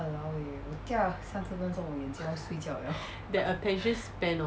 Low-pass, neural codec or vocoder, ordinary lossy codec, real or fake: none; none; none; real